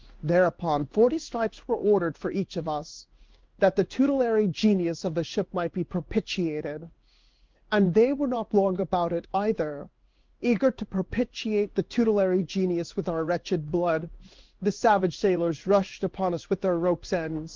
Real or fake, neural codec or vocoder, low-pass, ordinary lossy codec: fake; codec, 16 kHz in and 24 kHz out, 1 kbps, XY-Tokenizer; 7.2 kHz; Opus, 32 kbps